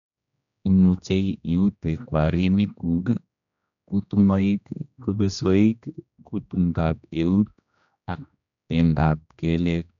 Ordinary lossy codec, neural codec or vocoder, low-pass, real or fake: none; codec, 16 kHz, 1 kbps, X-Codec, HuBERT features, trained on general audio; 7.2 kHz; fake